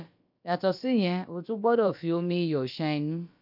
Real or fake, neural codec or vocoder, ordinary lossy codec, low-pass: fake; codec, 16 kHz, about 1 kbps, DyCAST, with the encoder's durations; none; 5.4 kHz